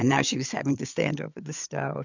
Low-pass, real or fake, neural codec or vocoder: 7.2 kHz; fake; codec, 16 kHz, 8 kbps, FreqCodec, larger model